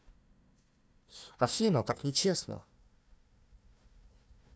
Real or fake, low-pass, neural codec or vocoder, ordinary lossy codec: fake; none; codec, 16 kHz, 1 kbps, FunCodec, trained on Chinese and English, 50 frames a second; none